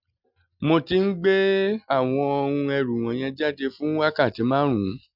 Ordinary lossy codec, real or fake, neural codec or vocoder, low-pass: none; real; none; 5.4 kHz